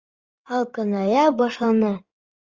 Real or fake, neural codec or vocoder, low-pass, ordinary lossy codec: fake; codec, 16 kHz in and 24 kHz out, 2.2 kbps, FireRedTTS-2 codec; 7.2 kHz; Opus, 32 kbps